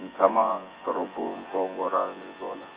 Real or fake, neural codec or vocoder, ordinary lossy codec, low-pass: fake; vocoder, 24 kHz, 100 mel bands, Vocos; Opus, 32 kbps; 3.6 kHz